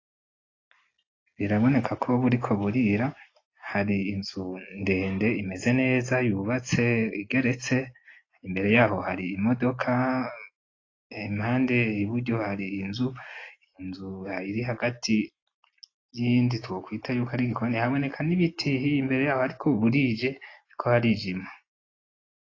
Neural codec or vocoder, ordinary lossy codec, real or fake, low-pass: none; AAC, 32 kbps; real; 7.2 kHz